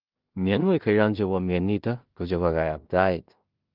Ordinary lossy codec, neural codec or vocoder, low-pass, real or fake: Opus, 32 kbps; codec, 16 kHz in and 24 kHz out, 0.4 kbps, LongCat-Audio-Codec, two codebook decoder; 5.4 kHz; fake